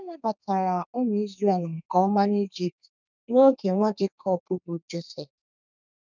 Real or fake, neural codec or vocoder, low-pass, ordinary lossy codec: fake; codec, 32 kHz, 1.9 kbps, SNAC; 7.2 kHz; none